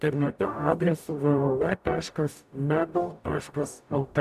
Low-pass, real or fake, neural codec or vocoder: 14.4 kHz; fake; codec, 44.1 kHz, 0.9 kbps, DAC